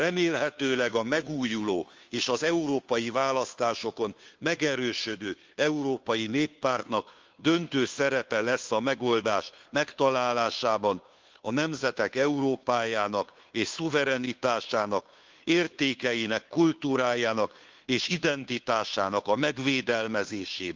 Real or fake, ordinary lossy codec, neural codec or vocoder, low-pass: fake; Opus, 32 kbps; codec, 16 kHz, 2 kbps, FunCodec, trained on Chinese and English, 25 frames a second; 7.2 kHz